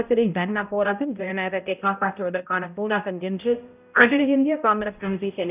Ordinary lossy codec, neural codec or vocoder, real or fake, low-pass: none; codec, 16 kHz, 0.5 kbps, X-Codec, HuBERT features, trained on balanced general audio; fake; 3.6 kHz